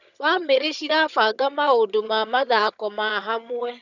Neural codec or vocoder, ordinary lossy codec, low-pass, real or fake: vocoder, 22.05 kHz, 80 mel bands, HiFi-GAN; none; 7.2 kHz; fake